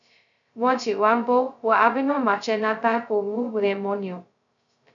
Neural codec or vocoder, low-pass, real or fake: codec, 16 kHz, 0.2 kbps, FocalCodec; 7.2 kHz; fake